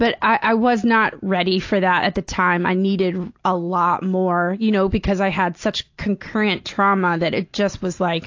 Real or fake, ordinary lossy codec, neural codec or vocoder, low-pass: real; AAC, 48 kbps; none; 7.2 kHz